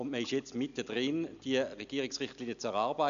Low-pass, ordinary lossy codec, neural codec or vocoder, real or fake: 7.2 kHz; none; none; real